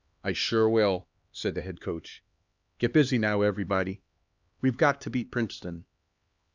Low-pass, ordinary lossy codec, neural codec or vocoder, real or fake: 7.2 kHz; Opus, 64 kbps; codec, 16 kHz, 2 kbps, X-Codec, HuBERT features, trained on LibriSpeech; fake